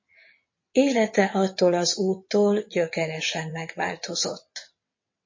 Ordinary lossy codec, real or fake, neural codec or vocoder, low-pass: MP3, 32 kbps; fake; vocoder, 22.05 kHz, 80 mel bands, WaveNeXt; 7.2 kHz